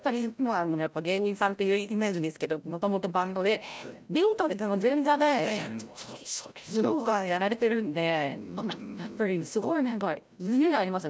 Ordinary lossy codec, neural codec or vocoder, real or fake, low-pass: none; codec, 16 kHz, 0.5 kbps, FreqCodec, larger model; fake; none